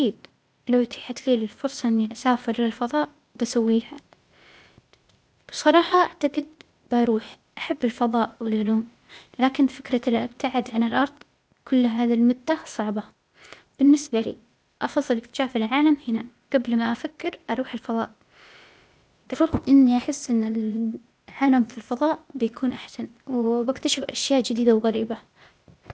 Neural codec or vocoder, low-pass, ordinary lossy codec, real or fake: codec, 16 kHz, 0.8 kbps, ZipCodec; none; none; fake